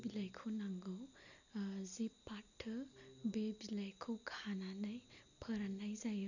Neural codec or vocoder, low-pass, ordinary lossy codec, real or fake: none; 7.2 kHz; none; real